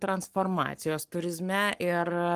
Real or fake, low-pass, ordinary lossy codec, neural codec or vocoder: fake; 14.4 kHz; Opus, 24 kbps; codec, 44.1 kHz, 7.8 kbps, Pupu-Codec